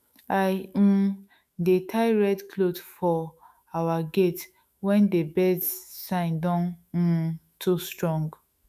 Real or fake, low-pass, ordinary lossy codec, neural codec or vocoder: fake; 14.4 kHz; none; autoencoder, 48 kHz, 128 numbers a frame, DAC-VAE, trained on Japanese speech